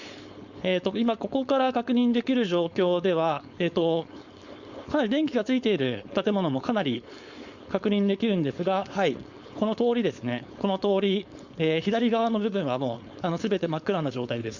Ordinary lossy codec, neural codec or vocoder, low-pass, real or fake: Opus, 64 kbps; codec, 16 kHz, 4.8 kbps, FACodec; 7.2 kHz; fake